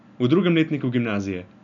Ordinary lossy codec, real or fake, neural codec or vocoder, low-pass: none; real; none; 7.2 kHz